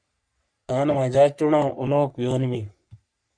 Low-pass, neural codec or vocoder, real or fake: 9.9 kHz; codec, 44.1 kHz, 3.4 kbps, Pupu-Codec; fake